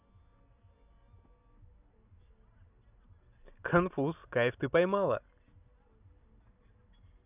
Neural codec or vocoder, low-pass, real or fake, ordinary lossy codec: none; 3.6 kHz; real; none